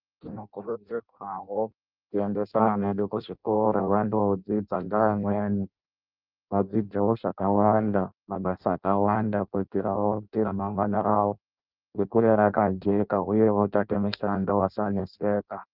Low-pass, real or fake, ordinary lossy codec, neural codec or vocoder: 5.4 kHz; fake; Opus, 32 kbps; codec, 16 kHz in and 24 kHz out, 0.6 kbps, FireRedTTS-2 codec